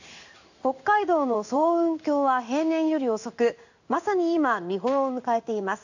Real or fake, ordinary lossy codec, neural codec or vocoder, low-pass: fake; none; codec, 16 kHz in and 24 kHz out, 1 kbps, XY-Tokenizer; 7.2 kHz